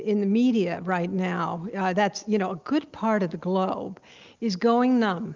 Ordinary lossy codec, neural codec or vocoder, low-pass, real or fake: Opus, 32 kbps; none; 7.2 kHz; real